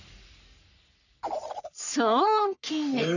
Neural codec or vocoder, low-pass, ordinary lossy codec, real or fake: codec, 44.1 kHz, 3.4 kbps, Pupu-Codec; 7.2 kHz; none; fake